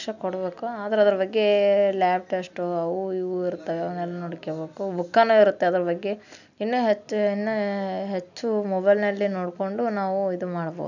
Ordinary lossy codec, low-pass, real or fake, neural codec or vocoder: none; 7.2 kHz; real; none